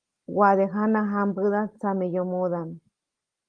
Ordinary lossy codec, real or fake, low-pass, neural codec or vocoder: Opus, 24 kbps; real; 9.9 kHz; none